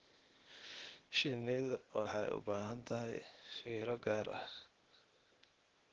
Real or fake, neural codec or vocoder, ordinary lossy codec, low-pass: fake; codec, 16 kHz, 0.8 kbps, ZipCodec; Opus, 16 kbps; 7.2 kHz